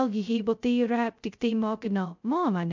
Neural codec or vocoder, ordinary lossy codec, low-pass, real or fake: codec, 16 kHz, 0.2 kbps, FocalCodec; MP3, 64 kbps; 7.2 kHz; fake